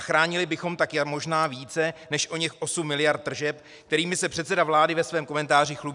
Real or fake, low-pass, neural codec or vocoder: real; 10.8 kHz; none